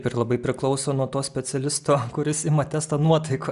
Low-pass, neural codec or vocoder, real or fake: 10.8 kHz; none; real